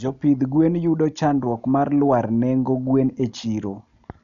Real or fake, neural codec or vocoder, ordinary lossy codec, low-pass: real; none; none; 7.2 kHz